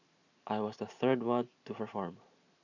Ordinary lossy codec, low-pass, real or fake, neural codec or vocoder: none; 7.2 kHz; fake; vocoder, 44.1 kHz, 128 mel bands every 512 samples, BigVGAN v2